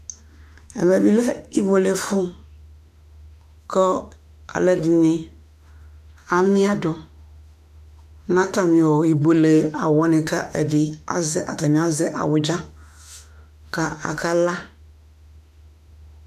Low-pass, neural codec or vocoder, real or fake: 14.4 kHz; autoencoder, 48 kHz, 32 numbers a frame, DAC-VAE, trained on Japanese speech; fake